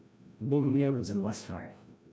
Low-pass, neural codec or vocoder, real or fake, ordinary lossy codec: none; codec, 16 kHz, 0.5 kbps, FreqCodec, larger model; fake; none